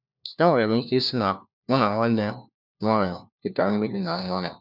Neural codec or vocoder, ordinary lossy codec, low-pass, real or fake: codec, 16 kHz, 1 kbps, FunCodec, trained on LibriTTS, 50 frames a second; none; 5.4 kHz; fake